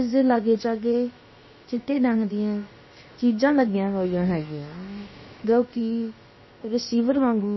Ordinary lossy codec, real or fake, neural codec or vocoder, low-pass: MP3, 24 kbps; fake; codec, 16 kHz, about 1 kbps, DyCAST, with the encoder's durations; 7.2 kHz